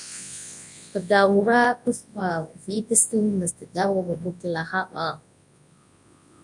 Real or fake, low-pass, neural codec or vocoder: fake; 10.8 kHz; codec, 24 kHz, 0.9 kbps, WavTokenizer, large speech release